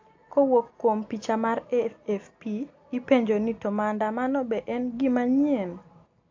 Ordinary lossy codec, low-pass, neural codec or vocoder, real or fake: AAC, 48 kbps; 7.2 kHz; none; real